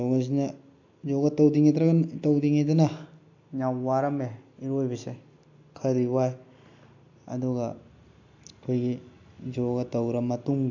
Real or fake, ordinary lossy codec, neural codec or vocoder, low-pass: real; AAC, 48 kbps; none; 7.2 kHz